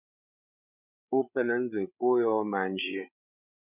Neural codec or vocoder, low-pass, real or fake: codec, 16 kHz, 8 kbps, FreqCodec, larger model; 3.6 kHz; fake